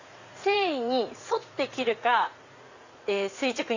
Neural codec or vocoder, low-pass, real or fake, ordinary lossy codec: vocoder, 44.1 kHz, 80 mel bands, Vocos; 7.2 kHz; fake; Opus, 64 kbps